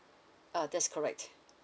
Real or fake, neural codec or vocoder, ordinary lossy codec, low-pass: real; none; none; none